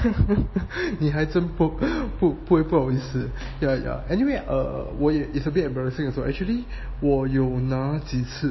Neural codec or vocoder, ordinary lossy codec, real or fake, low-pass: none; MP3, 24 kbps; real; 7.2 kHz